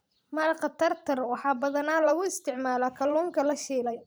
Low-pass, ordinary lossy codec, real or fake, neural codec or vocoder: none; none; fake; vocoder, 44.1 kHz, 128 mel bands every 512 samples, BigVGAN v2